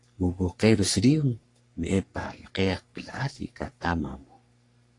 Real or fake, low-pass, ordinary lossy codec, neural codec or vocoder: fake; 10.8 kHz; AAC, 48 kbps; codec, 44.1 kHz, 3.4 kbps, Pupu-Codec